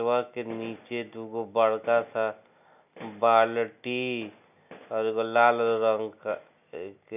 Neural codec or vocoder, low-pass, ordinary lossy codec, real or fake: none; 3.6 kHz; none; real